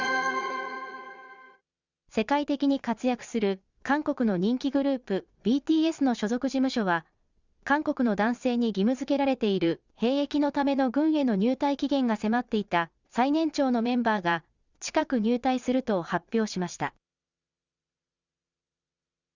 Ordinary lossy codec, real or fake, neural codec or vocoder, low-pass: Opus, 64 kbps; fake; vocoder, 22.05 kHz, 80 mel bands, Vocos; 7.2 kHz